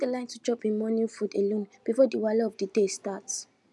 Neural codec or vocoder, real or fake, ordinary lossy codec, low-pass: none; real; none; none